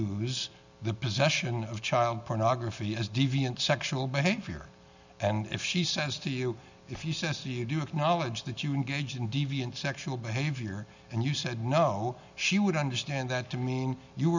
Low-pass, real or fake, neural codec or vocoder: 7.2 kHz; real; none